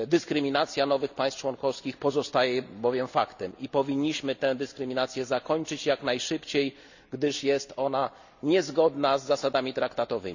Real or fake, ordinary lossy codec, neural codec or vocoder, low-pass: real; none; none; 7.2 kHz